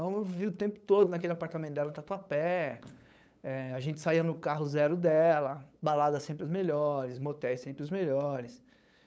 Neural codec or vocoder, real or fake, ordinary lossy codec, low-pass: codec, 16 kHz, 8 kbps, FunCodec, trained on LibriTTS, 25 frames a second; fake; none; none